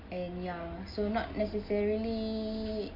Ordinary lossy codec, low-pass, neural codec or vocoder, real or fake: MP3, 32 kbps; 5.4 kHz; none; real